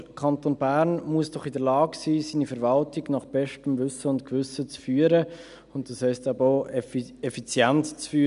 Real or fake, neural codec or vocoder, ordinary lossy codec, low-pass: real; none; none; 10.8 kHz